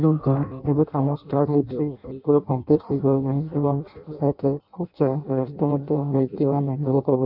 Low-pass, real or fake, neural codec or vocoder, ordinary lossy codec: 5.4 kHz; fake; codec, 16 kHz in and 24 kHz out, 0.6 kbps, FireRedTTS-2 codec; none